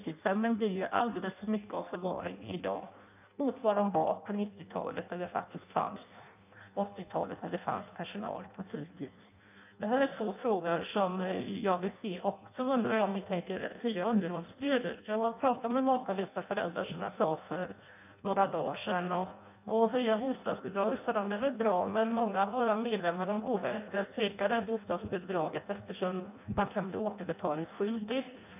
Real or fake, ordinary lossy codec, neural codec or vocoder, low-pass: fake; none; codec, 16 kHz in and 24 kHz out, 0.6 kbps, FireRedTTS-2 codec; 3.6 kHz